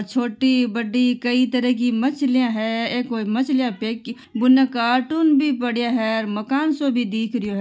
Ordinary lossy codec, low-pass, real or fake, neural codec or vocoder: none; none; real; none